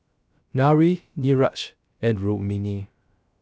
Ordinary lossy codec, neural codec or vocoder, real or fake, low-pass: none; codec, 16 kHz, 0.3 kbps, FocalCodec; fake; none